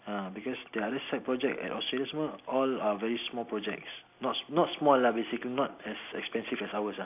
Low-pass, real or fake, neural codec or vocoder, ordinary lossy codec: 3.6 kHz; real; none; AAC, 32 kbps